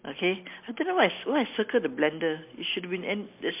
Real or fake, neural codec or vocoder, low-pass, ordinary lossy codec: real; none; 3.6 kHz; MP3, 32 kbps